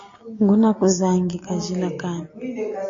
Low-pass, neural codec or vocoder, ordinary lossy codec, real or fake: 7.2 kHz; none; AAC, 48 kbps; real